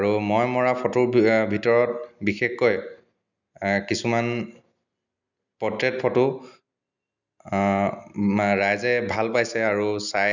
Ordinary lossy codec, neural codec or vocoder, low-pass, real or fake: none; none; 7.2 kHz; real